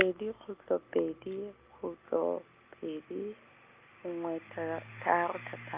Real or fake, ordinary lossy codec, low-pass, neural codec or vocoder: real; Opus, 24 kbps; 3.6 kHz; none